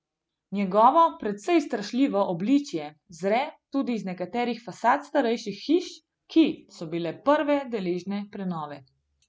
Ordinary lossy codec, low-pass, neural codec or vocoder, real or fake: none; none; none; real